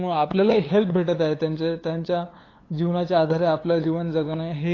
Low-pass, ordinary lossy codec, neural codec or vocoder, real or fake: 7.2 kHz; AAC, 32 kbps; codec, 16 kHz, 8 kbps, FunCodec, trained on LibriTTS, 25 frames a second; fake